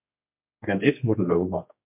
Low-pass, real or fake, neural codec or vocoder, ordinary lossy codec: 3.6 kHz; fake; codec, 16 kHz, 2 kbps, X-Codec, HuBERT features, trained on general audio; MP3, 32 kbps